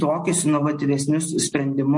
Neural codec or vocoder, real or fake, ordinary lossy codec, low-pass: none; real; MP3, 48 kbps; 10.8 kHz